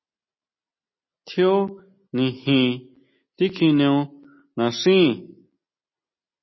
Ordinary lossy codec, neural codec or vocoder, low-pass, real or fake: MP3, 24 kbps; none; 7.2 kHz; real